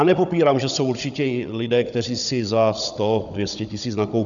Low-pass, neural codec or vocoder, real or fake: 7.2 kHz; codec, 16 kHz, 16 kbps, FunCodec, trained on Chinese and English, 50 frames a second; fake